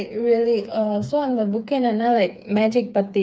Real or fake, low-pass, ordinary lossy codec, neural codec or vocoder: fake; none; none; codec, 16 kHz, 4 kbps, FreqCodec, smaller model